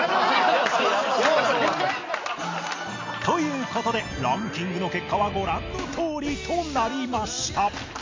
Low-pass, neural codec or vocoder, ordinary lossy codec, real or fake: 7.2 kHz; none; MP3, 48 kbps; real